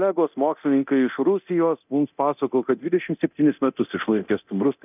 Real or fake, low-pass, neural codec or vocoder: fake; 3.6 kHz; codec, 24 kHz, 0.9 kbps, DualCodec